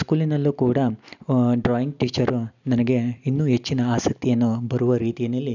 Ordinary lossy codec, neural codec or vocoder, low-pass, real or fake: none; none; 7.2 kHz; real